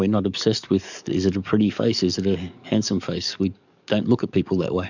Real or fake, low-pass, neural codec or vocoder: fake; 7.2 kHz; codec, 44.1 kHz, 7.8 kbps, DAC